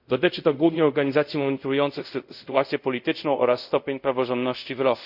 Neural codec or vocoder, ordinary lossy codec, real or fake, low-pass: codec, 24 kHz, 0.5 kbps, DualCodec; none; fake; 5.4 kHz